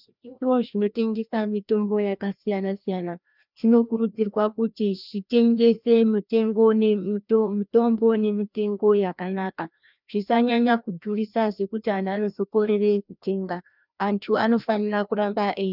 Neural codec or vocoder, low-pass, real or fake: codec, 16 kHz, 1 kbps, FreqCodec, larger model; 5.4 kHz; fake